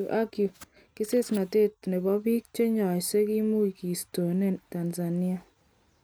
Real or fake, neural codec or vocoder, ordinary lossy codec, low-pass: real; none; none; none